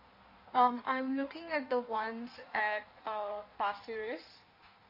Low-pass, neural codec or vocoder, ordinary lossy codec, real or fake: 5.4 kHz; codec, 16 kHz in and 24 kHz out, 1.1 kbps, FireRedTTS-2 codec; MP3, 32 kbps; fake